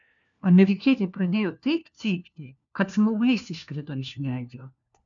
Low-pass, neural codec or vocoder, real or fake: 7.2 kHz; codec, 16 kHz, 1 kbps, FunCodec, trained on LibriTTS, 50 frames a second; fake